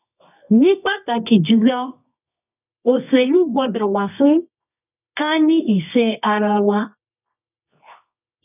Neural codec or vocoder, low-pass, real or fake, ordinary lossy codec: codec, 24 kHz, 0.9 kbps, WavTokenizer, medium music audio release; 3.6 kHz; fake; none